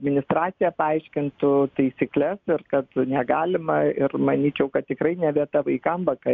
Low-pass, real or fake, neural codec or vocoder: 7.2 kHz; real; none